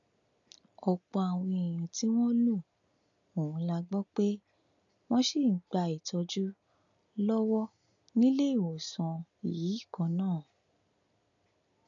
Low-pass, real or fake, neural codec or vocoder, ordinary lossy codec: 7.2 kHz; real; none; none